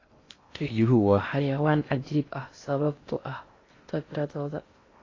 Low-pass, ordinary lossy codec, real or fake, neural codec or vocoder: 7.2 kHz; AAC, 32 kbps; fake; codec, 16 kHz in and 24 kHz out, 0.6 kbps, FocalCodec, streaming, 4096 codes